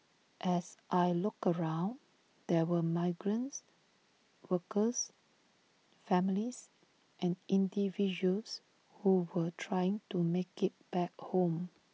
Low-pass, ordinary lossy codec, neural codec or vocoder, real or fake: none; none; none; real